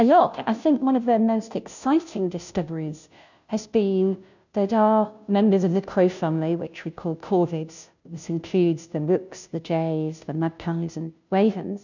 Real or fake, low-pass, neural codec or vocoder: fake; 7.2 kHz; codec, 16 kHz, 0.5 kbps, FunCodec, trained on Chinese and English, 25 frames a second